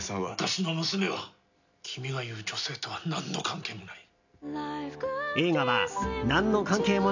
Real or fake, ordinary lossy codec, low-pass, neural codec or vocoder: real; none; 7.2 kHz; none